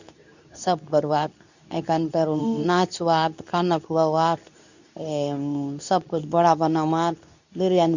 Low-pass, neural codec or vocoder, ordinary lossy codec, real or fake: 7.2 kHz; codec, 24 kHz, 0.9 kbps, WavTokenizer, medium speech release version 2; none; fake